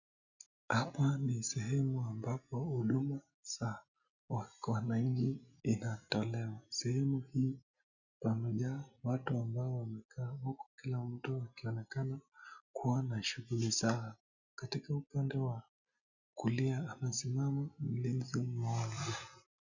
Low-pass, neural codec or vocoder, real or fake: 7.2 kHz; none; real